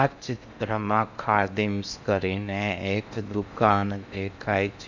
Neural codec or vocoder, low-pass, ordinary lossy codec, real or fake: codec, 16 kHz in and 24 kHz out, 0.8 kbps, FocalCodec, streaming, 65536 codes; 7.2 kHz; Opus, 64 kbps; fake